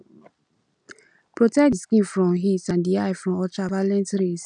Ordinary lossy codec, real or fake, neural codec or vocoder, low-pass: none; real; none; 9.9 kHz